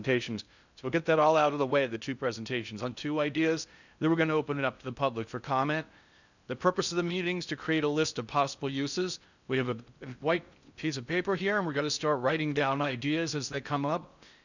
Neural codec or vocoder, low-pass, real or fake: codec, 16 kHz in and 24 kHz out, 0.6 kbps, FocalCodec, streaming, 4096 codes; 7.2 kHz; fake